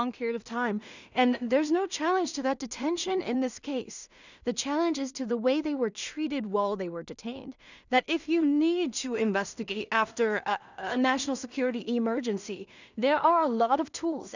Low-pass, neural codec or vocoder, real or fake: 7.2 kHz; codec, 16 kHz in and 24 kHz out, 0.4 kbps, LongCat-Audio-Codec, two codebook decoder; fake